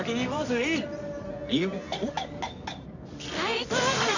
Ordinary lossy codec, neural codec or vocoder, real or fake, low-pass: none; codec, 24 kHz, 0.9 kbps, WavTokenizer, medium music audio release; fake; 7.2 kHz